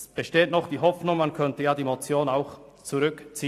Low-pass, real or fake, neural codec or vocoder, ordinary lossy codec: 14.4 kHz; real; none; AAC, 64 kbps